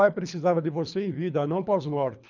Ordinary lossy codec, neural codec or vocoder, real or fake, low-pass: none; codec, 24 kHz, 3 kbps, HILCodec; fake; 7.2 kHz